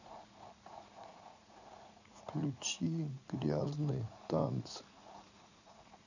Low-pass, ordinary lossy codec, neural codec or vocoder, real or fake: 7.2 kHz; none; vocoder, 44.1 kHz, 80 mel bands, Vocos; fake